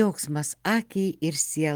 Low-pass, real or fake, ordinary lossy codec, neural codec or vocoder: 19.8 kHz; real; Opus, 24 kbps; none